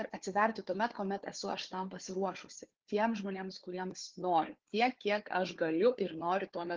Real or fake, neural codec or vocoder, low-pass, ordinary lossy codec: fake; codec, 16 kHz, 4 kbps, FunCodec, trained on Chinese and English, 50 frames a second; 7.2 kHz; Opus, 16 kbps